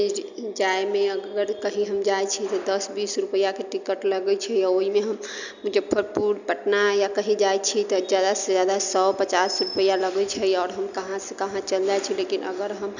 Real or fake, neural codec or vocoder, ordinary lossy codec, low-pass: real; none; none; 7.2 kHz